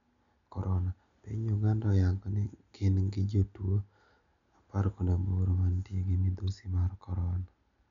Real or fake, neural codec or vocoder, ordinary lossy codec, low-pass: real; none; none; 7.2 kHz